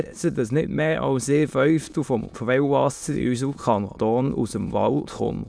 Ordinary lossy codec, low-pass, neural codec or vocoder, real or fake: none; none; autoencoder, 22.05 kHz, a latent of 192 numbers a frame, VITS, trained on many speakers; fake